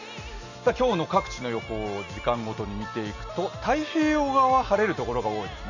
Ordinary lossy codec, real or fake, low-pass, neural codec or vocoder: none; real; 7.2 kHz; none